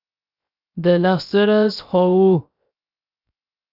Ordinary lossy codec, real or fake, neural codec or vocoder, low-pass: Opus, 64 kbps; fake; codec, 16 kHz, 0.3 kbps, FocalCodec; 5.4 kHz